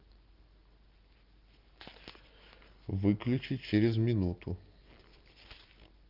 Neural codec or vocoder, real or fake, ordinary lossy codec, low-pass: none; real; Opus, 32 kbps; 5.4 kHz